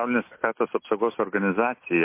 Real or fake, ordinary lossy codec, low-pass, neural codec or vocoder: real; MP3, 24 kbps; 3.6 kHz; none